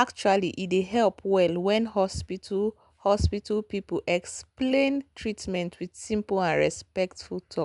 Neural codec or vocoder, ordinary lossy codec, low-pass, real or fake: none; none; 10.8 kHz; real